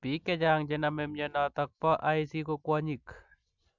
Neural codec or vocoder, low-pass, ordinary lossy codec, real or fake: none; 7.2 kHz; none; real